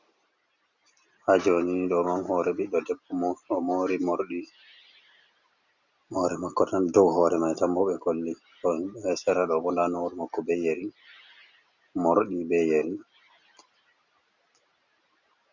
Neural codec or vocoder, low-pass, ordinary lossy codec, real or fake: none; 7.2 kHz; Opus, 64 kbps; real